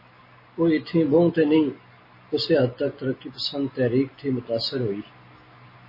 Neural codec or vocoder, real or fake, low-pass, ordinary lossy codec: none; real; 5.4 kHz; MP3, 24 kbps